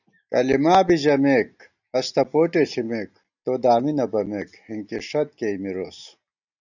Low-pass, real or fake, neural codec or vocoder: 7.2 kHz; real; none